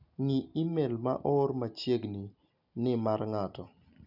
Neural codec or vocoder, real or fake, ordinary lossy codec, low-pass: none; real; none; 5.4 kHz